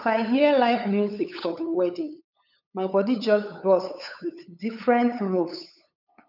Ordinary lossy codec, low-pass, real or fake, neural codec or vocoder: none; 5.4 kHz; fake; codec, 16 kHz, 8 kbps, FunCodec, trained on LibriTTS, 25 frames a second